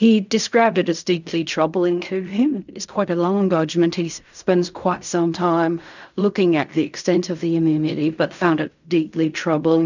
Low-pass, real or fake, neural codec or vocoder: 7.2 kHz; fake; codec, 16 kHz in and 24 kHz out, 0.4 kbps, LongCat-Audio-Codec, fine tuned four codebook decoder